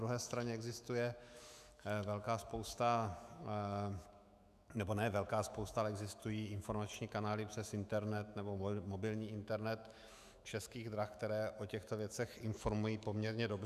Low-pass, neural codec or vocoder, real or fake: 14.4 kHz; autoencoder, 48 kHz, 128 numbers a frame, DAC-VAE, trained on Japanese speech; fake